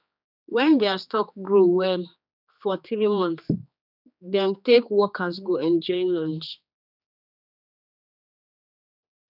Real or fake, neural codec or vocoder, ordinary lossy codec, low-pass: fake; codec, 16 kHz, 2 kbps, X-Codec, HuBERT features, trained on general audio; none; 5.4 kHz